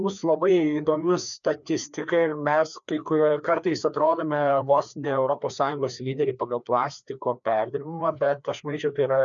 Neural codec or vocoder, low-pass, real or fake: codec, 16 kHz, 2 kbps, FreqCodec, larger model; 7.2 kHz; fake